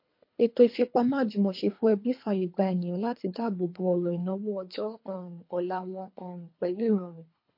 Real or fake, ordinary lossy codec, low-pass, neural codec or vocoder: fake; MP3, 32 kbps; 5.4 kHz; codec, 24 kHz, 3 kbps, HILCodec